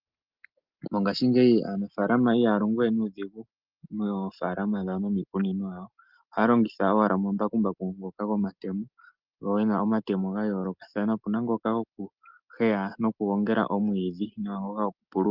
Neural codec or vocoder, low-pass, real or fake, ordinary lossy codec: none; 5.4 kHz; real; Opus, 32 kbps